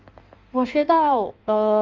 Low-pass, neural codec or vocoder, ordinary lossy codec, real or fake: 7.2 kHz; codec, 16 kHz in and 24 kHz out, 1.1 kbps, FireRedTTS-2 codec; Opus, 32 kbps; fake